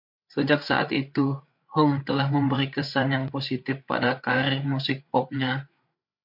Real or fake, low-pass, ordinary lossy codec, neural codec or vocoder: fake; 5.4 kHz; MP3, 48 kbps; codec, 16 kHz, 8 kbps, FreqCodec, larger model